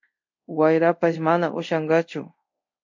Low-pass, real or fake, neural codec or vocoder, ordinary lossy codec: 7.2 kHz; fake; codec, 24 kHz, 0.5 kbps, DualCodec; MP3, 48 kbps